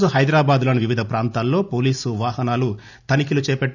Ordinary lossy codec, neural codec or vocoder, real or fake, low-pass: none; none; real; 7.2 kHz